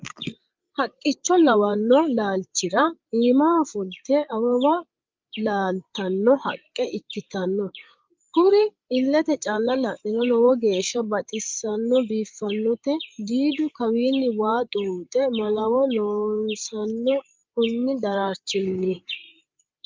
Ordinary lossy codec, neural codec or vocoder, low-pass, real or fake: Opus, 24 kbps; codec, 16 kHz, 8 kbps, FreqCodec, larger model; 7.2 kHz; fake